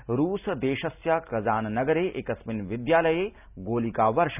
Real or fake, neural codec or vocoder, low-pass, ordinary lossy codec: real; none; 3.6 kHz; none